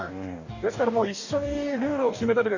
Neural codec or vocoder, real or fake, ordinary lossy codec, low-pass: codec, 44.1 kHz, 2.6 kbps, DAC; fake; none; 7.2 kHz